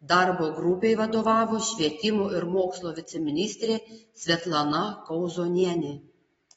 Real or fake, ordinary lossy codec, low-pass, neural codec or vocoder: real; AAC, 24 kbps; 10.8 kHz; none